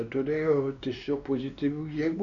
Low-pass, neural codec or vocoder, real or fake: 7.2 kHz; codec, 16 kHz, 2 kbps, X-Codec, WavLM features, trained on Multilingual LibriSpeech; fake